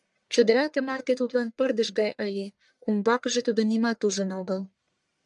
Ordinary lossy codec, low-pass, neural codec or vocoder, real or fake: MP3, 96 kbps; 10.8 kHz; codec, 44.1 kHz, 1.7 kbps, Pupu-Codec; fake